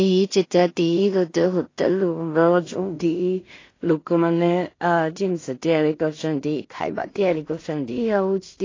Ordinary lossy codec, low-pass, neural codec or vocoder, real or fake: AAC, 32 kbps; 7.2 kHz; codec, 16 kHz in and 24 kHz out, 0.4 kbps, LongCat-Audio-Codec, two codebook decoder; fake